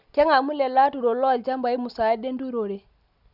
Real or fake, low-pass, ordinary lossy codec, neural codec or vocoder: real; 5.4 kHz; none; none